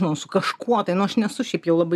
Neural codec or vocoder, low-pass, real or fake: none; 14.4 kHz; real